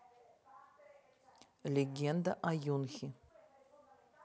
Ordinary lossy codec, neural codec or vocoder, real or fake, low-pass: none; none; real; none